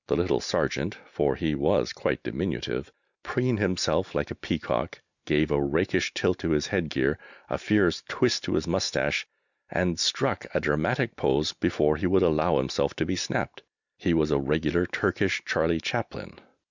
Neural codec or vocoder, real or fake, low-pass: none; real; 7.2 kHz